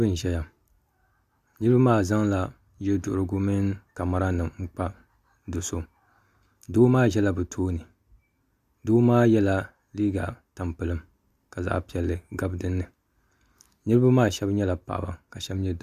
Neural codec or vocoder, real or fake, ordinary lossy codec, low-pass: none; real; Opus, 64 kbps; 14.4 kHz